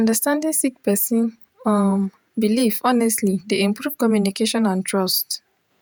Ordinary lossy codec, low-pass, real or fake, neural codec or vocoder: none; none; fake; vocoder, 48 kHz, 128 mel bands, Vocos